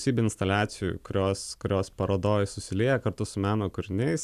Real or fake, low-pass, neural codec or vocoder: real; 14.4 kHz; none